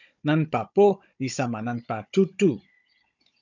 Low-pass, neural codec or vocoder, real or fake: 7.2 kHz; codec, 16 kHz, 16 kbps, FunCodec, trained on Chinese and English, 50 frames a second; fake